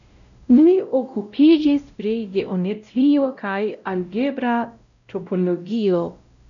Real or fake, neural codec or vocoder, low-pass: fake; codec, 16 kHz, 0.5 kbps, X-Codec, WavLM features, trained on Multilingual LibriSpeech; 7.2 kHz